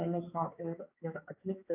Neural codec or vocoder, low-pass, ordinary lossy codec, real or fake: codec, 16 kHz, 4 kbps, X-Codec, HuBERT features, trained on balanced general audio; 3.6 kHz; AAC, 24 kbps; fake